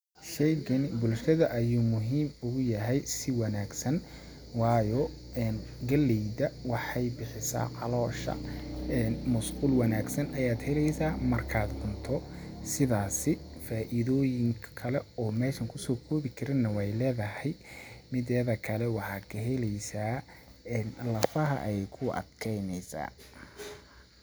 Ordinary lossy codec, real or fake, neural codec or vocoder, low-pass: none; real; none; none